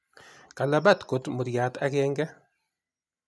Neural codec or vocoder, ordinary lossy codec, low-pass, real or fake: none; none; none; real